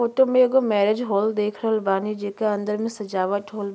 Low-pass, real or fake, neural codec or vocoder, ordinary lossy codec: none; real; none; none